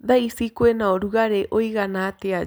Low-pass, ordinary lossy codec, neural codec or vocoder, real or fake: none; none; none; real